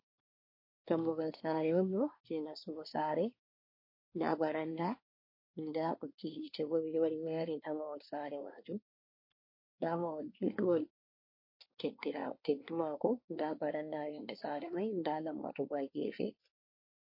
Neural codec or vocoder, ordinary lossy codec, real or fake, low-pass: codec, 24 kHz, 1 kbps, SNAC; MP3, 32 kbps; fake; 5.4 kHz